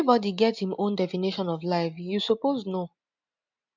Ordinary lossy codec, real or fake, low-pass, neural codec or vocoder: MP3, 64 kbps; real; 7.2 kHz; none